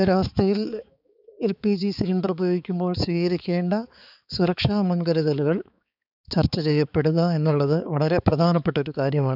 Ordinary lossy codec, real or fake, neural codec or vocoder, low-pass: none; fake; codec, 16 kHz, 4 kbps, X-Codec, HuBERT features, trained on balanced general audio; 5.4 kHz